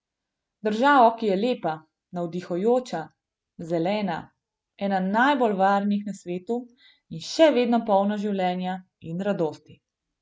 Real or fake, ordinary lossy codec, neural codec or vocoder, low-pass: real; none; none; none